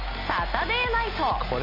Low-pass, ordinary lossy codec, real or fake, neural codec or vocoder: 5.4 kHz; MP3, 48 kbps; real; none